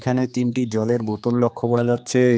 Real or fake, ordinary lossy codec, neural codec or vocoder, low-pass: fake; none; codec, 16 kHz, 2 kbps, X-Codec, HuBERT features, trained on general audio; none